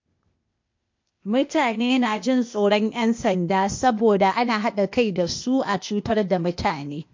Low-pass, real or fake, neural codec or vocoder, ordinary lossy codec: 7.2 kHz; fake; codec, 16 kHz, 0.8 kbps, ZipCodec; MP3, 48 kbps